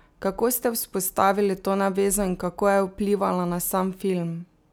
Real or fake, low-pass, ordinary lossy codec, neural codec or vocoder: real; none; none; none